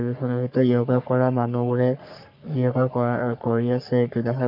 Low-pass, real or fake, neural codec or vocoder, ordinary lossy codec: 5.4 kHz; fake; codec, 44.1 kHz, 3.4 kbps, Pupu-Codec; MP3, 48 kbps